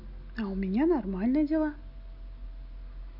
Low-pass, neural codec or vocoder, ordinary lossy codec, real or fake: 5.4 kHz; none; none; real